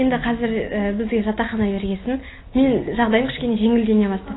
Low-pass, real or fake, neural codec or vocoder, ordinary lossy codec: 7.2 kHz; real; none; AAC, 16 kbps